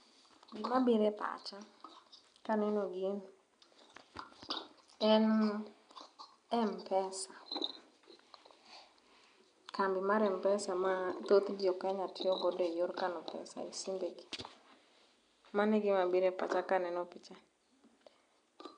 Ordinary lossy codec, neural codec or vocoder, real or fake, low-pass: none; none; real; 9.9 kHz